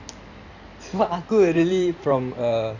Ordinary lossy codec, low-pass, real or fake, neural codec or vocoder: none; 7.2 kHz; fake; codec, 16 kHz in and 24 kHz out, 2.2 kbps, FireRedTTS-2 codec